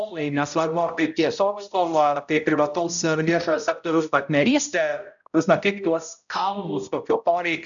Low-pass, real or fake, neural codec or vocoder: 7.2 kHz; fake; codec, 16 kHz, 0.5 kbps, X-Codec, HuBERT features, trained on balanced general audio